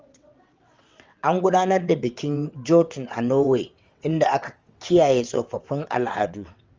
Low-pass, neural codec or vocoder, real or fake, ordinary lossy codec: 7.2 kHz; vocoder, 22.05 kHz, 80 mel bands, WaveNeXt; fake; Opus, 32 kbps